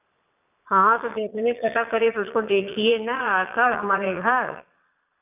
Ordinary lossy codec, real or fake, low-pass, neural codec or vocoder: none; fake; 3.6 kHz; vocoder, 22.05 kHz, 80 mel bands, Vocos